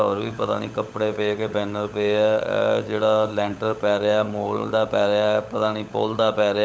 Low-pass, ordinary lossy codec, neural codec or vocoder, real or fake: none; none; codec, 16 kHz, 16 kbps, FunCodec, trained on LibriTTS, 50 frames a second; fake